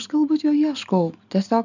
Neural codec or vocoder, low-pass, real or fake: none; 7.2 kHz; real